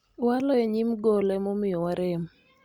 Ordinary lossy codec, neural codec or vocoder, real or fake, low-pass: Opus, 64 kbps; none; real; 19.8 kHz